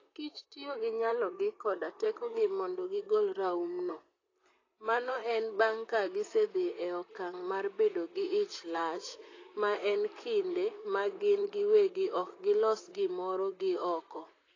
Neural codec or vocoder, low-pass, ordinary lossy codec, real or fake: vocoder, 44.1 kHz, 128 mel bands, Pupu-Vocoder; 7.2 kHz; AAC, 32 kbps; fake